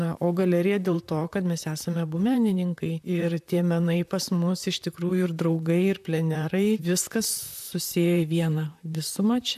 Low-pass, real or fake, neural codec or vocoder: 14.4 kHz; fake; vocoder, 44.1 kHz, 128 mel bands, Pupu-Vocoder